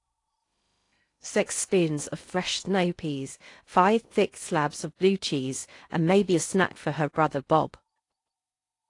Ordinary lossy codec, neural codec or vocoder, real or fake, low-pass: AAC, 48 kbps; codec, 16 kHz in and 24 kHz out, 0.6 kbps, FocalCodec, streaming, 2048 codes; fake; 10.8 kHz